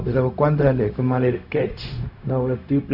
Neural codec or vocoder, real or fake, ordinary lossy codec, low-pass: codec, 16 kHz, 0.4 kbps, LongCat-Audio-Codec; fake; none; 5.4 kHz